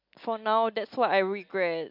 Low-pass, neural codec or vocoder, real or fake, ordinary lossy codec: 5.4 kHz; none; real; MP3, 48 kbps